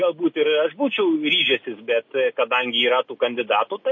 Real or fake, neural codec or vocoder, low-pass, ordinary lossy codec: real; none; 7.2 kHz; MP3, 32 kbps